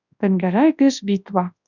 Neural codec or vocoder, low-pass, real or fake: codec, 24 kHz, 0.9 kbps, WavTokenizer, large speech release; 7.2 kHz; fake